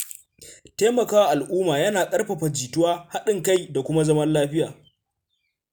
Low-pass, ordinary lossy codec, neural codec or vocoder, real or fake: none; none; none; real